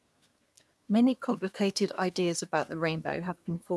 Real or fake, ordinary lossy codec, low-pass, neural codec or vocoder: fake; none; none; codec, 24 kHz, 1 kbps, SNAC